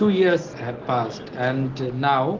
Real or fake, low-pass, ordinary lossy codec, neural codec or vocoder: real; 7.2 kHz; Opus, 16 kbps; none